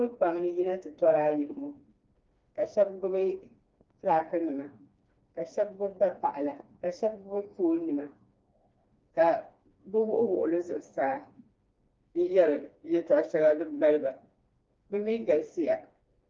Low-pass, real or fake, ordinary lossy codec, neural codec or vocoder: 7.2 kHz; fake; Opus, 32 kbps; codec, 16 kHz, 2 kbps, FreqCodec, smaller model